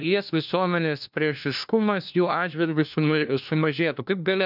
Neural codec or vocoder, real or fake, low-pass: codec, 16 kHz, 1 kbps, FunCodec, trained on LibriTTS, 50 frames a second; fake; 5.4 kHz